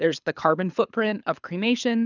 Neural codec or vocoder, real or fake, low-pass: codec, 24 kHz, 6 kbps, HILCodec; fake; 7.2 kHz